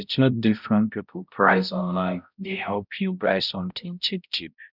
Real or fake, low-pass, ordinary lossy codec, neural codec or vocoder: fake; 5.4 kHz; none; codec, 16 kHz, 0.5 kbps, X-Codec, HuBERT features, trained on balanced general audio